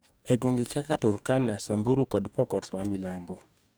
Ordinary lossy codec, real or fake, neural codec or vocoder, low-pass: none; fake; codec, 44.1 kHz, 2.6 kbps, DAC; none